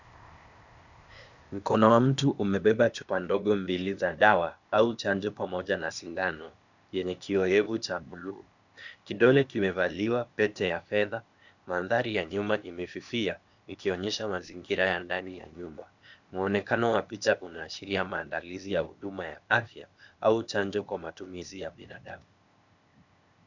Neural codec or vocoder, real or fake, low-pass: codec, 16 kHz, 0.8 kbps, ZipCodec; fake; 7.2 kHz